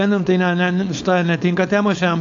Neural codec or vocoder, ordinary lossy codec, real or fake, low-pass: codec, 16 kHz, 4.8 kbps, FACodec; AAC, 64 kbps; fake; 7.2 kHz